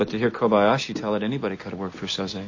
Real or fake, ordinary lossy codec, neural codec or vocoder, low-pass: real; MP3, 32 kbps; none; 7.2 kHz